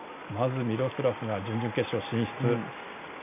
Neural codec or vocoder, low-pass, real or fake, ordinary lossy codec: none; 3.6 kHz; real; MP3, 32 kbps